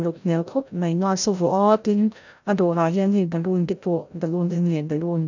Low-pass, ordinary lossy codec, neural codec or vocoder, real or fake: 7.2 kHz; none; codec, 16 kHz, 0.5 kbps, FreqCodec, larger model; fake